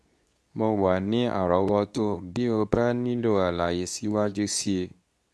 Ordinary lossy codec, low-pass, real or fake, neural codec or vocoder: none; none; fake; codec, 24 kHz, 0.9 kbps, WavTokenizer, medium speech release version 2